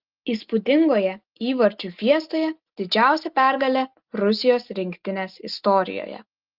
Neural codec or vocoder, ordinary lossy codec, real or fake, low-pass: none; Opus, 32 kbps; real; 5.4 kHz